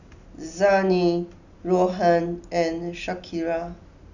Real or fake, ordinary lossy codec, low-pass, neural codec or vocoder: real; none; 7.2 kHz; none